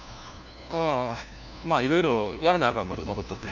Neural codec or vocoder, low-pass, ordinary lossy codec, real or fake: codec, 16 kHz, 1 kbps, FunCodec, trained on LibriTTS, 50 frames a second; 7.2 kHz; none; fake